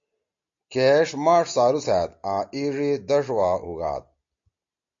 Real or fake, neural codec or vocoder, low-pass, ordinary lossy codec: real; none; 7.2 kHz; AAC, 48 kbps